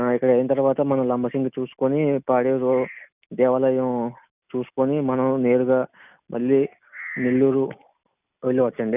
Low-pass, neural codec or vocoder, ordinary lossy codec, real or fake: 3.6 kHz; none; none; real